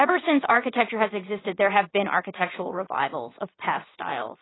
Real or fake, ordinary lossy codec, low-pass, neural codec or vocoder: fake; AAC, 16 kbps; 7.2 kHz; vocoder, 22.05 kHz, 80 mel bands, WaveNeXt